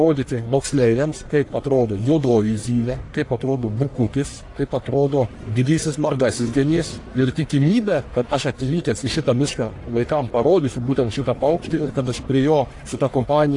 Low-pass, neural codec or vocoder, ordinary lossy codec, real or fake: 10.8 kHz; codec, 44.1 kHz, 1.7 kbps, Pupu-Codec; AAC, 48 kbps; fake